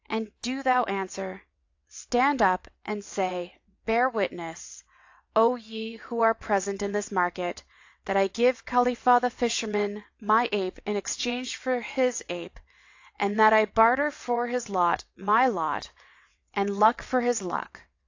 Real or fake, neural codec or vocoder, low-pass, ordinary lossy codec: fake; vocoder, 22.05 kHz, 80 mel bands, WaveNeXt; 7.2 kHz; AAC, 48 kbps